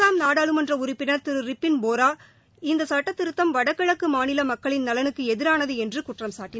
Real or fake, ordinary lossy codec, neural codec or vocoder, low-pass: real; none; none; none